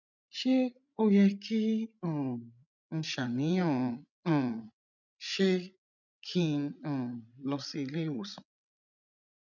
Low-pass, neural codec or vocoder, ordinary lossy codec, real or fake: 7.2 kHz; codec, 16 kHz, 16 kbps, FreqCodec, larger model; none; fake